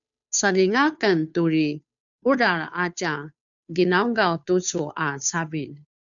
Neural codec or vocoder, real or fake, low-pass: codec, 16 kHz, 2 kbps, FunCodec, trained on Chinese and English, 25 frames a second; fake; 7.2 kHz